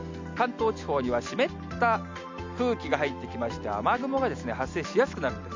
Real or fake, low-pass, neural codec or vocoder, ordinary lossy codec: real; 7.2 kHz; none; MP3, 64 kbps